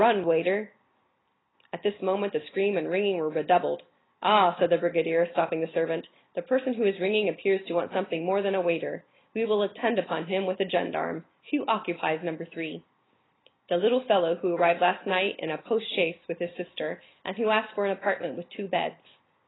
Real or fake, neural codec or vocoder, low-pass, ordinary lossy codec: real; none; 7.2 kHz; AAC, 16 kbps